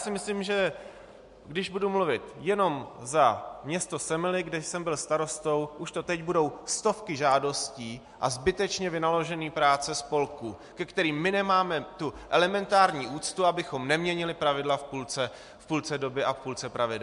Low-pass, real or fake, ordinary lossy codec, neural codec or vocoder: 10.8 kHz; real; MP3, 64 kbps; none